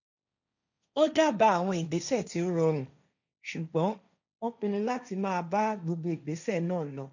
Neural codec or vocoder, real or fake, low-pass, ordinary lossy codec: codec, 16 kHz, 1.1 kbps, Voila-Tokenizer; fake; 7.2 kHz; none